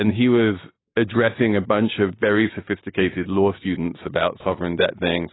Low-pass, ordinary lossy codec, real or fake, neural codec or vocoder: 7.2 kHz; AAC, 16 kbps; real; none